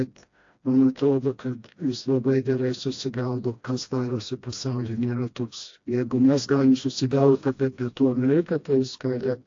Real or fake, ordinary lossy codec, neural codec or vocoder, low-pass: fake; AAC, 48 kbps; codec, 16 kHz, 1 kbps, FreqCodec, smaller model; 7.2 kHz